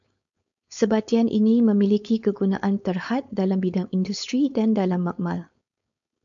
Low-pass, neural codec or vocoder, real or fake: 7.2 kHz; codec, 16 kHz, 4.8 kbps, FACodec; fake